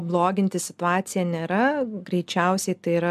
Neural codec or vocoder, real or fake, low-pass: none; real; 14.4 kHz